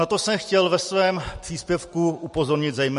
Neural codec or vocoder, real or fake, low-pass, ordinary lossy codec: none; real; 14.4 kHz; MP3, 48 kbps